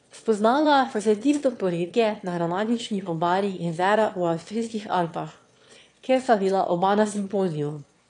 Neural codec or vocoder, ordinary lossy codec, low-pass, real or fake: autoencoder, 22.05 kHz, a latent of 192 numbers a frame, VITS, trained on one speaker; AAC, 48 kbps; 9.9 kHz; fake